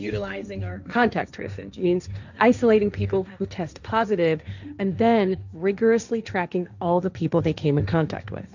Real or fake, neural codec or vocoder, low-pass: fake; codec, 16 kHz, 1.1 kbps, Voila-Tokenizer; 7.2 kHz